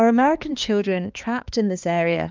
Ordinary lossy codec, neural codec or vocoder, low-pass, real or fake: Opus, 24 kbps; codec, 16 kHz, 2 kbps, X-Codec, HuBERT features, trained on balanced general audio; 7.2 kHz; fake